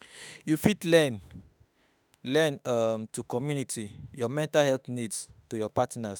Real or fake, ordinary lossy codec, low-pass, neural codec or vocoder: fake; none; none; autoencoder, 48 kHz, 32 numbers a frame, DAC-VAE, trained on Japanese speech